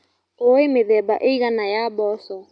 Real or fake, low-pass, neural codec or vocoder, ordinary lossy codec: real; 9.9 kHz; none; none